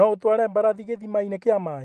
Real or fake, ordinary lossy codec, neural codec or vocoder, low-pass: real; Opus, 32 kbps; none; 14.4 kHz